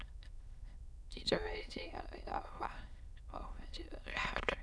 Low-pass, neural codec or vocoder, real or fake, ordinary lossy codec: none; autoencoder, 22.05 kHz, a latent of 192 numbers a frame, VITS, trained on many speakers; fake; none